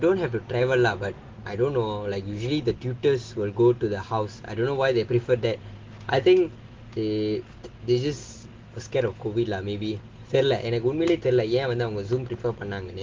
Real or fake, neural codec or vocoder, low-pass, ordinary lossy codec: real; none; 7.2 kHz; Opus, 16 kbps